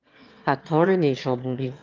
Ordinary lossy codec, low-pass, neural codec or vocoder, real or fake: Opus, 24 kbps; 7.2 kHz; autoencoder, 22.05 kHz, a latent of 192 numbers a frame, VITS, trained on one speaker; fake